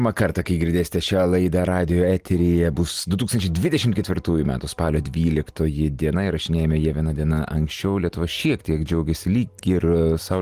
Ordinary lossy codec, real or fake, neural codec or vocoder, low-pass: Opus, 24 kbps; real; none; 14.4 kHz